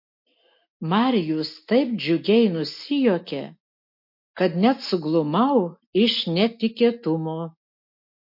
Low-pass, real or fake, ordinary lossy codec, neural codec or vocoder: 5.4 kHz; real; MP3, 32 kbps; none